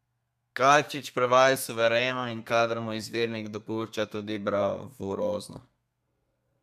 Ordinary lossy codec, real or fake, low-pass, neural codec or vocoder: MP3, 96 kbps; fake; 14.4 kHz; codec, 32 kHz, 1.9 kbps, SNAC